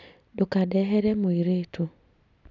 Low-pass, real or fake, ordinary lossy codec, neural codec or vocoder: 7.2 kHz; real; none; none